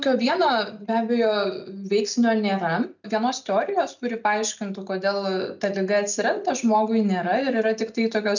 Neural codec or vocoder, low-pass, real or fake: none; 7.2 kHz; real